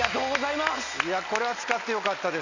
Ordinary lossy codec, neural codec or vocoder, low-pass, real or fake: Opus, 64 kbps; none; 7.2 kHz; real